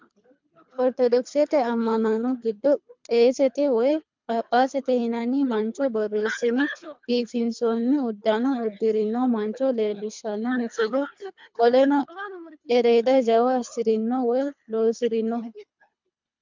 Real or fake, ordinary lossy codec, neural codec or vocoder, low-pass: fake; MP3, 64 kbps; codec, 24 kHz, 3 kbps, HILCodec; 7.2 kHz